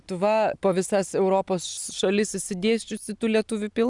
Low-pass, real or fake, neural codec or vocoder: 10.8 kHz; real; none